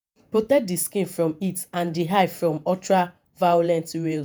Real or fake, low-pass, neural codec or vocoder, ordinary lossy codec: fake; none; vocoder, 48 kHz, 128 mel bands, Vocos; none